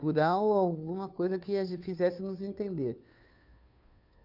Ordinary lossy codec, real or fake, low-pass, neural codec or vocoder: none; fake; 5.4 kHz; codec, 16 kHz, 2 kbps, FunCodec, trained on Chinese and English, 25 frames a second